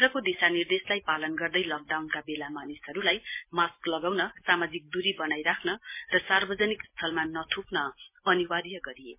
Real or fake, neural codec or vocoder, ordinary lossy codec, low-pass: real; none; MP3, 24 kbps; 3.6 kHz